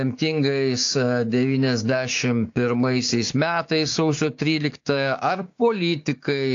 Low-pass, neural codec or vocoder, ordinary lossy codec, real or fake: 7.2 kHz; codec, 16 kHz, 6 kbps, DAC; AAC, 48 kbps; fake